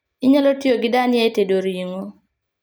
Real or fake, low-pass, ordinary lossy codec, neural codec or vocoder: real; none; none; none